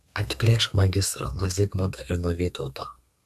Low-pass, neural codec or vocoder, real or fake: 14.4 kHz; codec, 32 kHz, 1.9 kbps, SNAC; fake